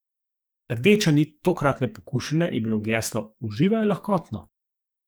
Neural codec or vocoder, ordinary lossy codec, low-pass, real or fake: codec, 44.1 kHz, 2.6 kbps, SNAC; none; none; fake